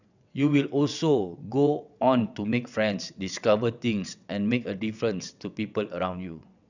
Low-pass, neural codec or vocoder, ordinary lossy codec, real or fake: 7.2 kHz; vocoder, 22.05 kHz, 80 mel bands, WaveNeXt; none; fake